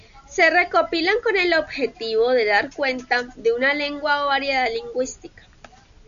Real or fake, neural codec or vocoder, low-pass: real; none; 7.2 kHz